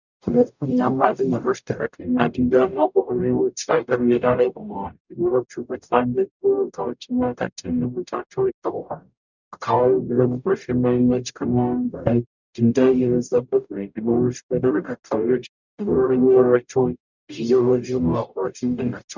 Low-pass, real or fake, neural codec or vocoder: 7.2 kHz; fake; codec, 44.1 kHz, 0.9 kbps, DAC